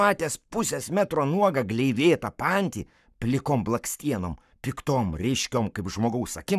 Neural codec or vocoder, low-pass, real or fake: codec, 44.1 kHz, 7.8 kbps, Pupu-Codec; 14.4 kHz; fake